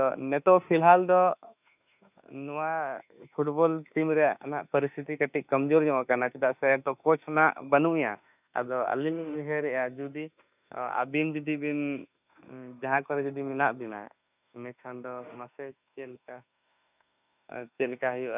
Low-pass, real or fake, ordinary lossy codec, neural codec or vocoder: 3.6 kHz; fake; none; autoencoder, 48 kHz, 32 numbers a frame, DAC-VAE, trained on Japanese speech